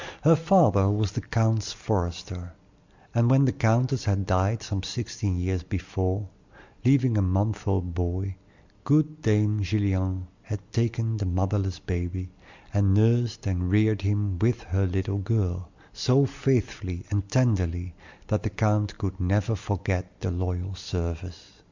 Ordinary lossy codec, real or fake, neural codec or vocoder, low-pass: Opus, 64 kbps; real; none; 7.2 kHz